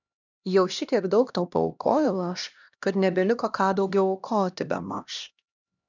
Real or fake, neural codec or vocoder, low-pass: fake; codec, 16 kHz, 1 kbps, X-Codec, HuBERT features, trained on LibriSpeech; 7.2 kHz